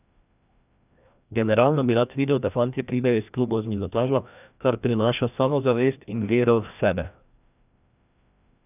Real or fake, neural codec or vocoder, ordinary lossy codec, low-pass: fake; codec, 16 kHz, 1 kbps, FreqCodec, larger model; none; 3.6 kHz